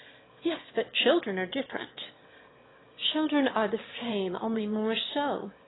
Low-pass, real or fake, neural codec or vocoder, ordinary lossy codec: 7.2 kHz; fake; autoencoder, 22.05 kHz, a latent of 192 numbers a frame, VITS, trained on one speaker; AAC, 16 kbps